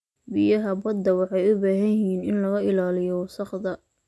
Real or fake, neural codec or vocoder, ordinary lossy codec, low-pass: real; none; none; none